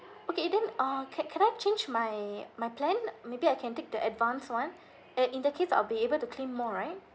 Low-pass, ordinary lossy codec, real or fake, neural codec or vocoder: none; none; real; none